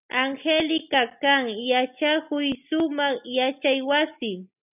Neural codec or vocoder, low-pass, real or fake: none; 3.6 kHz; real